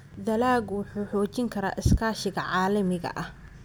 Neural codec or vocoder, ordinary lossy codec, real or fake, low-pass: none; none; real; none